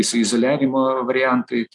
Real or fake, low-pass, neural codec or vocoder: real; 10.8 kHz; none